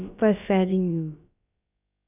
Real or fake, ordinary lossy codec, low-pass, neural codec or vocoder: fake; none; 3.6 kHz; codec, 16 kHz, about 1 kbps, DyCAST, with the encoder's durations